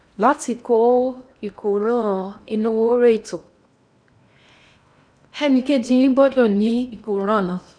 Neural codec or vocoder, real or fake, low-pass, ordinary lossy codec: codec, 16 kHz in and 24 kHz out, 0.8 kbps, FocalCodec, streaming, 65536 codes; fake; 9.9 kHz; none